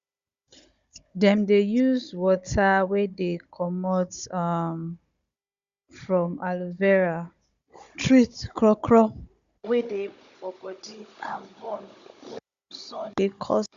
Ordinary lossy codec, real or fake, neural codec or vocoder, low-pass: Opus, 64 kbps; fake; codec, 16 kHz, 16 kbps, FunCodec, trained on Chinese and English, 50 frames a second; 7.2 kHz